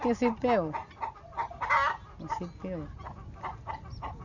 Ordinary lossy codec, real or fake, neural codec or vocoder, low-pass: none; real; none; 7.2 kHz